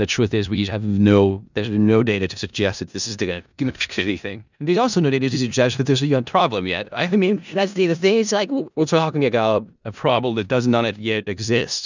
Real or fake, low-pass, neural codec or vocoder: fake; 7.2 kHz; codec, 16 kHz in and 24 kHz out, 0.4 kbps, LongCat-Audio-Codec, four codebook decoder